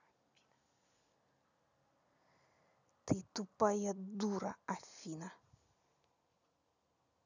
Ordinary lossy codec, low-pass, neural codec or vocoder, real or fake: none; 7.2 kHz; none; real